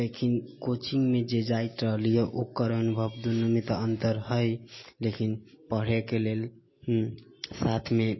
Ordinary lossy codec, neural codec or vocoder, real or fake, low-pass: MP3, 24 kbps; none; real; 7.2 kHz